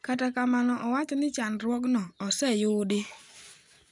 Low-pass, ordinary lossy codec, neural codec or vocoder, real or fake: 10.8 kHz; none; none; real